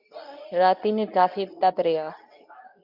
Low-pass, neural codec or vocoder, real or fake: 5.4 kHz; codec, 24 kHz, 0.9 kbps, WavTokenizer, medium speech release version 2; fake